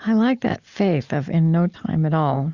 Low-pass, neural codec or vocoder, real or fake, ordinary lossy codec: 7.2 kHz; none; real; Opus, 64 kbps